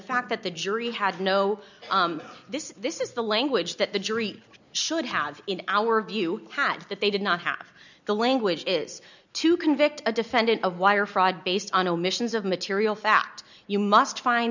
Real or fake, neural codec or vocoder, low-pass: real; none; 7.2 kHz